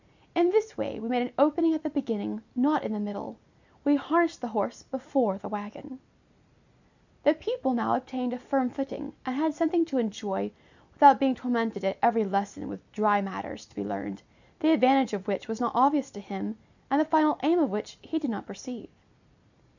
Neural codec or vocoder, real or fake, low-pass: none; real; 7.2 kHz